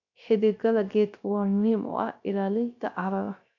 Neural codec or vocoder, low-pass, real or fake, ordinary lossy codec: codec, 16 kHz, 0.3 kbps, FocalCodec; 7.2 kHz; fake; none